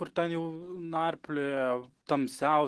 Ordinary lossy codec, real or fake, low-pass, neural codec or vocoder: Opus, 16 kbps; real; 10.8 kHz; none